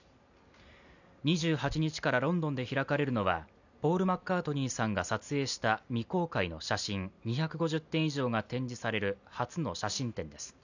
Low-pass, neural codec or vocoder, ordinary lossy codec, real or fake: 7.2 kHz; none; none; real